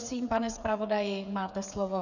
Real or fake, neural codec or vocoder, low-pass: fake; codec, 16 kHz, 8 kbps, FreqCodec, smaller model; 7.2 kHz